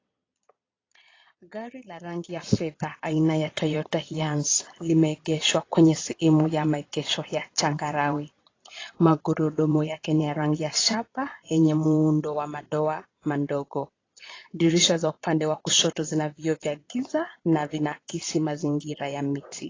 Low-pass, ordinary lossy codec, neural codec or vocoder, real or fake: 7.2 kHz; AAC, 32 kbps; vocoder, 22.05 kHz, 80 mel bands, Vocos; fake